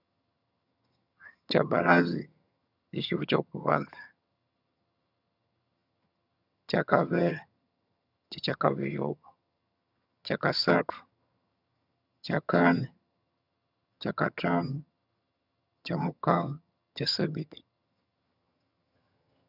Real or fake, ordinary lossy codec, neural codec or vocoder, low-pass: fake; none; vocoder, 22.05 kHz, 80 mel bands, HiFi-GAN; 5.4 kHz